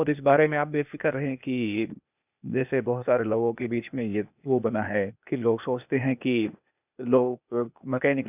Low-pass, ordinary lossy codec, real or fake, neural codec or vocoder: 3.6 kHz; none; fake; codec, 16 kHz, 0.8 kbps, ZipCodec